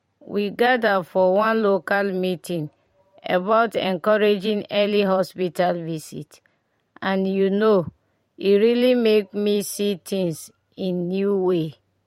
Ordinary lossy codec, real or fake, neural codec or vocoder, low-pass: MP3, 64 kbps; fake; vocoder, 44.1 kHz, 128 mel bands every 512 samples, BigVGAN v2; 19.8 kHz